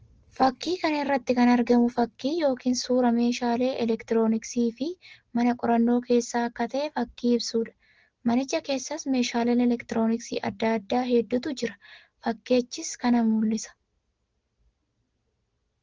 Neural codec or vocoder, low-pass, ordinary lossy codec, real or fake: none; 7.2 kHz; Opus, 16 kbps; real